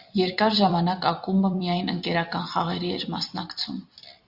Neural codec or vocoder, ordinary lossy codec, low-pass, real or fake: vocoder, 44.1 kHz, 128 mel bands every 256 samples, BigVGAN v2; Opus, 64 kbps; 5.4 kHz; fake